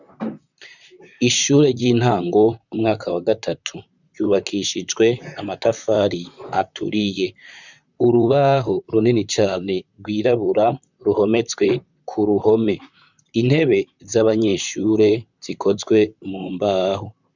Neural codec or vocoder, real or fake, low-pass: vocoder, 44.1 kHz, 128 mel bands, Pupu-Vocoder; fake; 7.2 kHz